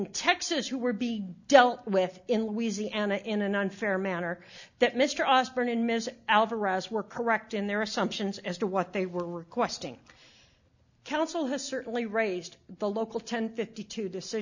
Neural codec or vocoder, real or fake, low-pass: none; real; 7.2 kHz